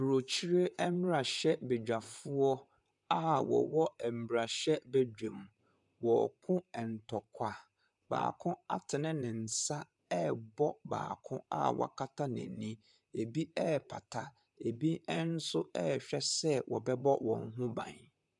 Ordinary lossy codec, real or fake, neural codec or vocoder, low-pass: MP3, 96 kbps; fake; vocoder, 44.1 kHz, 128 mel bands, Pupu-Vocoder; 10.8 kHz